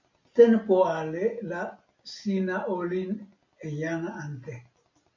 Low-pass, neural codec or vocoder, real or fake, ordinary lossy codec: 7.2 kHz; none; real; MP3, 48 kbps